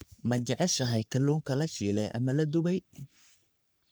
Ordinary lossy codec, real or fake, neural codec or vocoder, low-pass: none; fake; codec, 44.1 kHz, 3.4 kbps, Pupu-Codec; none